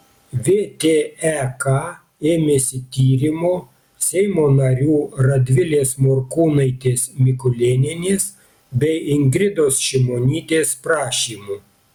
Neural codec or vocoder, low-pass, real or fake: none; 19.8 kHz; real